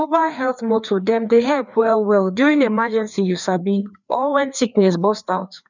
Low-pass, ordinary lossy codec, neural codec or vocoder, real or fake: 7.2 kHz; none; codec, 16 kHz, 2 kbps, FreqCodec, larger model; fake